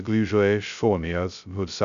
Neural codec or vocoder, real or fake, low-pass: codec, 16 kHz, 0.2 kbps, FocalCodec; fake; 7.2 kHz